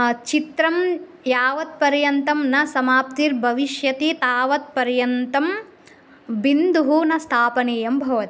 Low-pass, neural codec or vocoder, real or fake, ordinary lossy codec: none; none; real; none